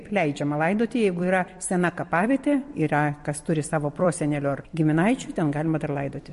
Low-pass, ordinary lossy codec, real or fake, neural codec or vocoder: 14.4 kHz; MP3, 48 kbps; fake; vocoder, 44.1 kHz, 128 mel bands every 256 samples, BigVGAN v2